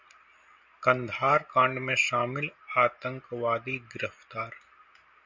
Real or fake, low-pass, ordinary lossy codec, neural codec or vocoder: real; 7.2 kHz; MP3, 64 kbps; none